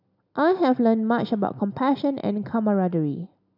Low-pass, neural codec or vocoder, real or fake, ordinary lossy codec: 5.4 kHz; none; real; none